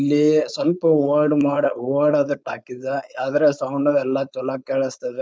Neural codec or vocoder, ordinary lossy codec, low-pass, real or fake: codec, 16 kHz, 4.8 kbps, FACodec; none; none; fake